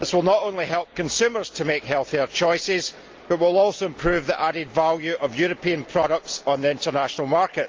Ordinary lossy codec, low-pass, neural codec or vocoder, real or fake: Opus, 32 kbps; 7.2 kHz; none; real